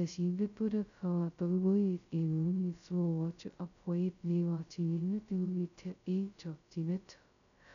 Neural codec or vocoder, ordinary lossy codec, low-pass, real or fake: codec, 16 kHz, 0.2 kbps, FocalCodec; none; 7.2 kHz; fake